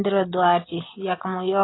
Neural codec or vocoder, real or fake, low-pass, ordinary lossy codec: none; real; 7.2 kHz; AAC, 16 kbps